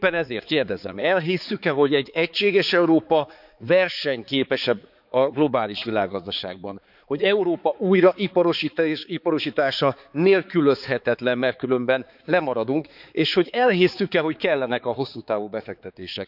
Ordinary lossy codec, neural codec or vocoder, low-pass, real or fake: none; codec, 16 kHz, 4 kbps, X-Codec, HuBERT features, trained on balanced general audio; 5.4 kHz; fake